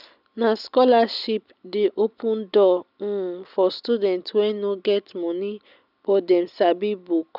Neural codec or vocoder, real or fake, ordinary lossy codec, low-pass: none; real; none; 5.4 kHz